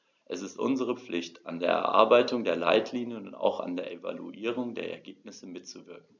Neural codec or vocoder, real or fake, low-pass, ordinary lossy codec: none; real; 7.2 kHz; none